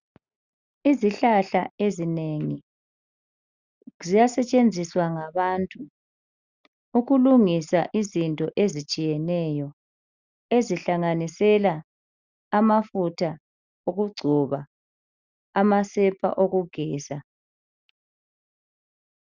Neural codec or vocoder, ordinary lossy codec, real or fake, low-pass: none; Opus, 64 kbps; real; 7.2 kHz